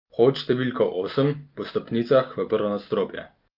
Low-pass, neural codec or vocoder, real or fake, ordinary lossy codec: 5.4 kHz; none; real; Opus, 32 kbps